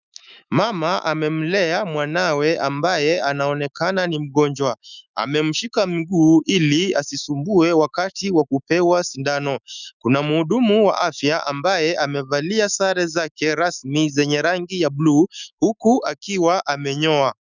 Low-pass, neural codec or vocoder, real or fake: 7.2 kHz; autoencoder, 48 kHz, 128 numbers a frame, DAC-VAE, trained on Japanese speech; fake